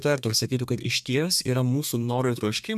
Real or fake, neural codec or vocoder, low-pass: fake; codec, 32 kHz, 1.9 kbps, SNAC; 14.4 kHz